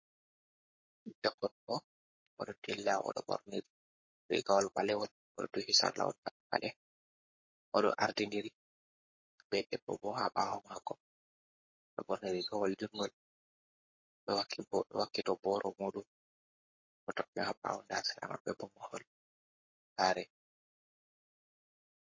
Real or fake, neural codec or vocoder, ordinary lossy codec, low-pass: fake; codec, 44.1 kHz, 7.8 kbps, Pupu-Codec; MP3, 32 kbps; 7.2 kHz